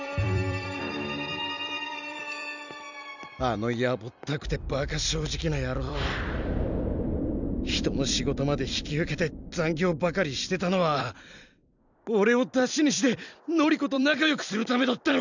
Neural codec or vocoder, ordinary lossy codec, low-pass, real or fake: vocoder, 44.1 kHz, 128 mel bands every 256 samples, BigVGAN v2; none; 7.2 kHz; fake